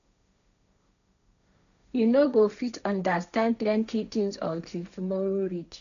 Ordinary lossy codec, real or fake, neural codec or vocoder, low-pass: none; fake; codec, 16 kHz, 1.1 kbps, Voila-Tokenizer; 7.2 kHz